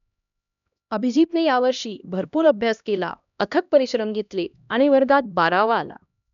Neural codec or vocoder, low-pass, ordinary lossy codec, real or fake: codec, 16 kHz, 1 kbps, X-Codec, HuBERT features, trained on LibriSpeech; 7.2 kHz; none; fake